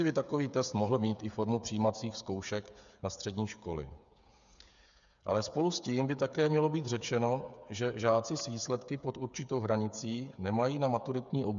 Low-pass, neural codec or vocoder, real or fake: 7.2 kHz; codec, 16 kHz, 8 kbps, FreqCodec, smaller model; fake